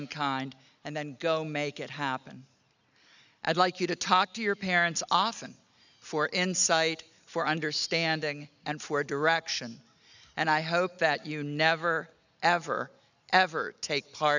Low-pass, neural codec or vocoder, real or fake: 7.2 kHz; none; real